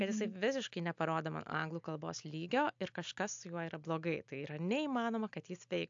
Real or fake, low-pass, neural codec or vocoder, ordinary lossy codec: real; 7.2 kHz; none; AAC, 64 kbps